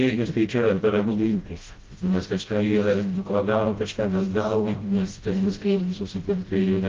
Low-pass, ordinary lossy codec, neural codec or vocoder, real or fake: 7.2 kHz; Opus, 32 kbps; codec, 16 kHz, 0.5 kbps, FreqCodec, smaller model; fake